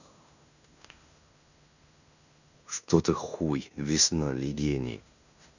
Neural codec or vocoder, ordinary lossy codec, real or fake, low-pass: codec, 16 kHz in and 24 kHz out, 0.9 kbps, LongCat-Audio-Codec, fine tuned four codebook decoder; none; fake; 7.2 kHz